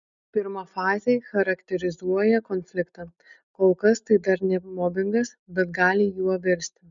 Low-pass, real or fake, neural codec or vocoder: 7.2 kHz; real; none